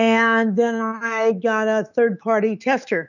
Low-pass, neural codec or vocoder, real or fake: 7.2 kHz; autoencoder, 48 kHz, 128 numbers a frame, DAC-VAE, trained on Japanese speech; fake